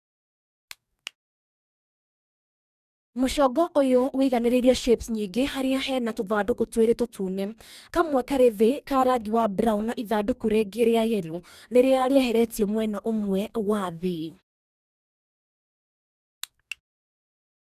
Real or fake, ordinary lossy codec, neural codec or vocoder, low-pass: fake; none; codec, 44.1 kHz, 2.6 kbps, DAC; 14.4 kHz